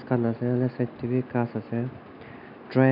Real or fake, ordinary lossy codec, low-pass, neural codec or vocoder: real; none; 5.4 kHz; none